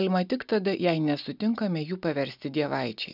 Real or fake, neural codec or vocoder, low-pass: real; none; 5.4 kHz